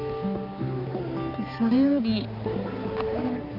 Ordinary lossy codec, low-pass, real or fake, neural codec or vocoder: none; 5.4 kHz; fake; codec, 16 kHz, 4 kbps, X-Codec, HuBERT features, trained on balanced general audio